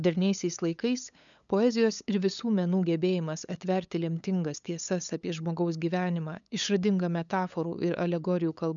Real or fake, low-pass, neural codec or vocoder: fake; 7.2 kHz; codec, 16 kHz, 8 kbps, FunCodec, trained on LibriTTS, 25 frames a second